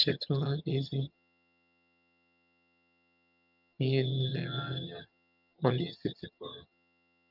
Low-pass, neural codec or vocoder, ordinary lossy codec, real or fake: 5.4 kHz; vocoder, 22.05 kHz, 80 mel bands, HiFi-GAN; none; fake